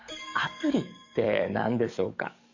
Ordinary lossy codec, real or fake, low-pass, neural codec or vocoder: Opus, 64 kbps; fake; 7.2 kHz; codec, 44.1 kHz, 7.8 kbps, DAC